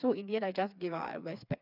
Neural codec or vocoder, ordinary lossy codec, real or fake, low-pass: codec, 16 kHz, 4 kbps, FreqCodec, smaller model; none; fake; 5.4 kHz